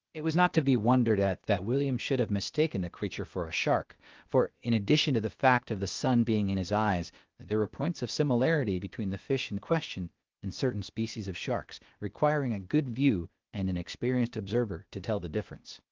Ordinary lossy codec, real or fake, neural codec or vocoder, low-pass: Opus, 32 kbps; fake; codec, 16 kHz, 0.8 kbps, ZipCodec; 7.2 kHz